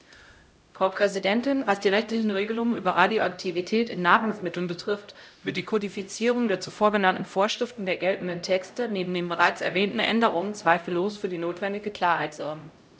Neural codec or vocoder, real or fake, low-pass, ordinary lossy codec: codec, 16 kHz, 0.5 kbps, X-Codec, HuBERT features, trained on LibriSpeech; fake; none; none